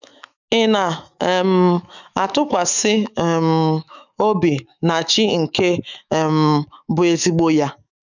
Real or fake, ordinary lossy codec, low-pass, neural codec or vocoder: fake; none; 7.2 kHz; autoencoder, 48 kHz, 128 numbers a frame, DAC-VAE, trained on Japanese speech